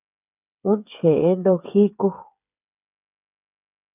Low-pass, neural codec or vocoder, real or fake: 3.6 kHz; codec, 16 kHz, 8 kbps, FreqCodec, smaller model; fake